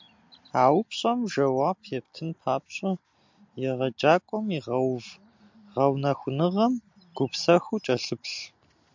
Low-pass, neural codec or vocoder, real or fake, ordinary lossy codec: 7.2 kHz; none; real; MP3, 64 kbps